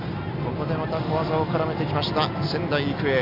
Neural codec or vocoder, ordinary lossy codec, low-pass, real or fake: none; MP3, 48 kbps; 5.4 kHz; real